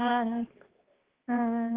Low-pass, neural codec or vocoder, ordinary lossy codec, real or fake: 3.6 kHz; codec, 16 kHz, 1 kbps, X-Codec, HuBERT features, trained on balanced general audio; Opus, 16 kbps; fake